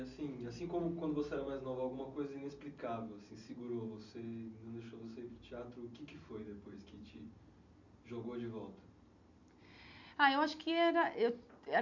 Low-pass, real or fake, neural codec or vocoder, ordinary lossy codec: 7.2 kHz; real; none; none